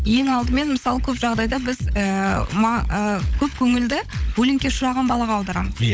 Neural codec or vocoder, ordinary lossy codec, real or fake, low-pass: codec, 16 kHz, 16 kbps, FunCodec, trained on LibriTTS, 50 frames a second; none; fake; none